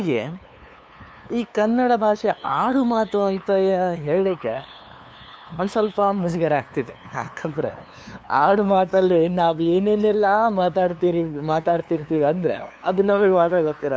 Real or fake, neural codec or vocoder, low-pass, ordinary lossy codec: fake; codec, 16 kHz, 2 kbps, FunCodec, trained on LibriTTS, 25 frames a second; none; none